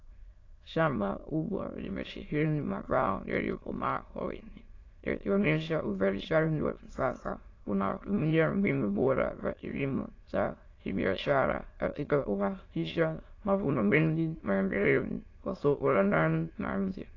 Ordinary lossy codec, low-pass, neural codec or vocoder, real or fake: AAC, 32 kbps; 7.2 kHz; autoencoder, 22.05 kHz, a latent of 192 numbers a frame, VITS, trained on many speakers; fake